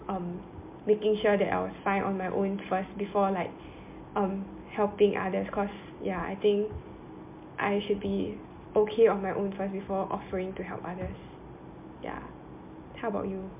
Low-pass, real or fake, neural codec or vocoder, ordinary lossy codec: 3.6 kHz; real; none; MP3, 32 kbps